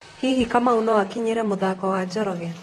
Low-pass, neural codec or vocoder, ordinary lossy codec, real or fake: 19.8 kHz; vocoder, 44.1 kHz, 128 mel bands, Pupu-Vocoder; AAC, 32 kbps; fake